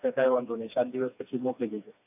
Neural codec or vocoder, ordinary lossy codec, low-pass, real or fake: codec, 16 kHz, 2 kbps, FreqCodec, smaller model; none; 3.6 kHz; fake